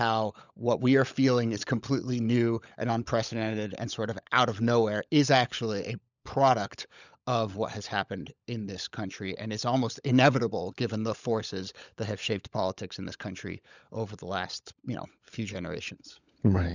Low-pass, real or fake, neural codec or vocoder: 7.2 kHz; fake; codec, 16 kHz, 16 kbps, FunCodec, trained on LibriTTS, 50 frames a second